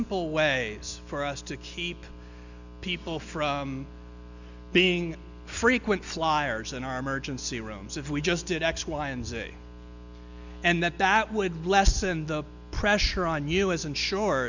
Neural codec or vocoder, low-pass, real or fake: none; 7.2 kHz; real